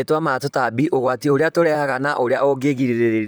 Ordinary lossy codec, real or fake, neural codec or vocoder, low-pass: none; fake; vocoder, 44.1 kHz, 128 mel bands, Pupu-Vocoder; none